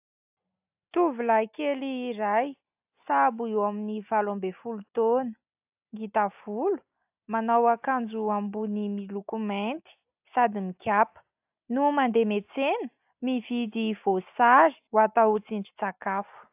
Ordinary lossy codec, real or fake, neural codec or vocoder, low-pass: AAC, 32 kbps; real; none; 3.6 kHz